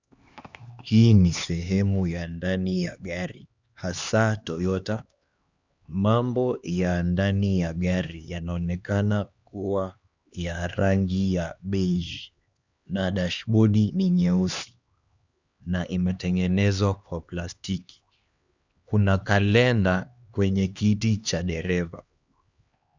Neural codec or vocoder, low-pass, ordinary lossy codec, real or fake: codec, 16 kHz, 2 kbps, X-Codec, HuBERT features, trained on LibriSpeech; 7.2 kHz; Opus, 64 kbps; fake